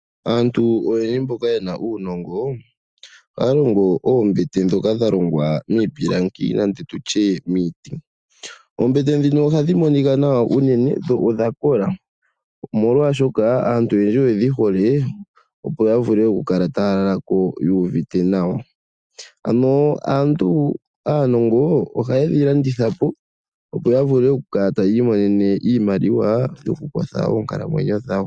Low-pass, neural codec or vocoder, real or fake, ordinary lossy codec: 9.9 kHz; none; real; Opus, 64 kbps